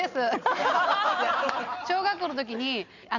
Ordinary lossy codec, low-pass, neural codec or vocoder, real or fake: none; 7.2 kHz; none; real